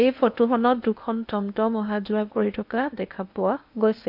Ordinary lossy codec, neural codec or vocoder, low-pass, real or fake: none; codec, 16 kHz in and 24 kHz out, 0.8 kbps, FocalCodec, streaming, 65536 codes; 5.4 kHz; fake